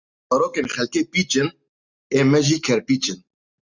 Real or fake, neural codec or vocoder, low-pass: real; none; 7.2 kHz